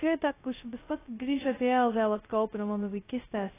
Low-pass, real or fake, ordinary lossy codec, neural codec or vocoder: 3.6 kHz; fake; AAC, 16 kbps; codec, 16 kHz, 0.2 kbps, FocalCodec